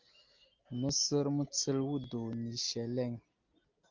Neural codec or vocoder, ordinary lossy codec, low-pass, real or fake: none; Opus, 32 kbps; 7.2 kHz; real